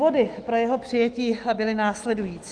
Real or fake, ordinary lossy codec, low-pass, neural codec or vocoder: fake; Opus, 24 kbps; 9.9 kHz; autoencoder, 48 kHz, 128 numbers a frame, DAC-VAE, trained on Japanese speech